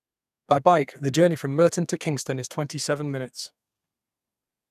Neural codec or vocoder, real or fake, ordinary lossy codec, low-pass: codec, 32 kHz, 1.9 kbps, SNAC; fake; none; 14.4 kHz